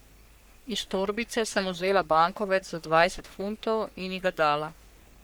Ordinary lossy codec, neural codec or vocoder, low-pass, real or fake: none; codec, 44.1 kHz, 3.4 kbps, Pupu-Codec; none; fake